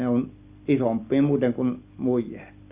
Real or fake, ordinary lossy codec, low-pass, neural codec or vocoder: real; Opus, 64 kbps; 3.6 kHz; none